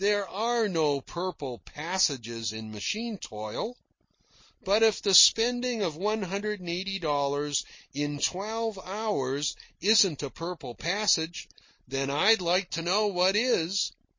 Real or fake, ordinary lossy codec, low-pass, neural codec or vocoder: real; MP3, 32 kbps; 7.2 kHz; none